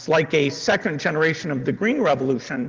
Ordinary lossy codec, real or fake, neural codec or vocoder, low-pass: Opus, 16 kbps; real; none; 7.2 kHz